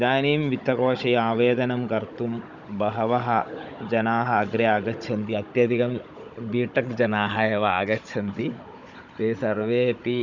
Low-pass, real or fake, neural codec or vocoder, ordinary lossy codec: 7.2 kHz; fake; codec, 16 kHz, 16 kbps, FunCodec, trained on Chinese and English, 50 frames a second; none